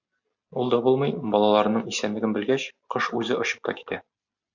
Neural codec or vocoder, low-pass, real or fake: none; 7.2 kHz; real